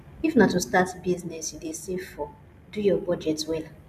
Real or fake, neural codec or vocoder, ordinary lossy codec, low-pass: real; none; none; 14.4 kHz